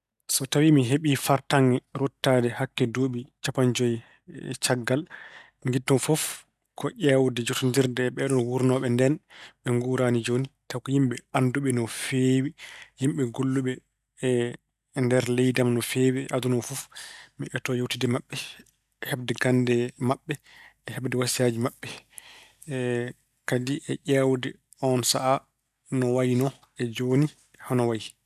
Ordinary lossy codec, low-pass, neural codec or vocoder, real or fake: none; 14.4 kHz; none; real